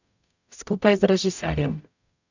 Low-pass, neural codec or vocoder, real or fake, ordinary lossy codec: 7.2 kHz; codec, 44.1 kHz, 0.9 kbps, DAC; fake; none